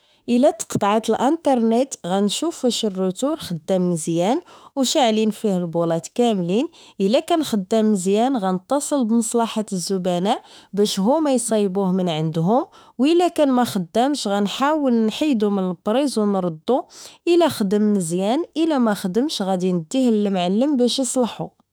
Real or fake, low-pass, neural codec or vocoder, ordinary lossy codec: fake; none; autoencoder, 48 kHz, 32 numbers a frame, DAC-VAE, trained on Japanese speech; none